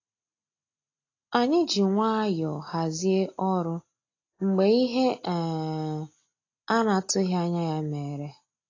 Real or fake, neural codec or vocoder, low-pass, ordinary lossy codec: real; none; 7.2 kHz; AAC, 32 kbps